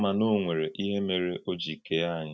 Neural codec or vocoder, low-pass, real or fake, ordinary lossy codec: none; none; real; none